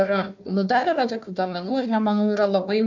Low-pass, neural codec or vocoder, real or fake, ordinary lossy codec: 7.2 kHz; codec, 16 kHz, 1 kbps, FunCodec, trained on Chinese and English, 50 frames a second; fake; MP3, 64 kbps